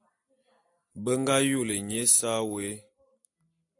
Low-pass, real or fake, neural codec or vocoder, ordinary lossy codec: 10.8 kHz; real; none; AAC, 64 kbps